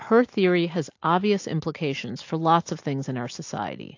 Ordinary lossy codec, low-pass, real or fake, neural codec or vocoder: AAC, 48 kbps; 7.2 kHz; real; none